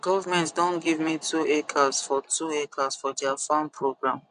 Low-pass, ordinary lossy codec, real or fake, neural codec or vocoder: 10.8 kHz; none; fake; vocoder, 24 kHz, 100 mel bands, Vocos